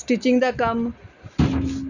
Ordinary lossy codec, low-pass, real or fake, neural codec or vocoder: none; 7.2 kHz; real; none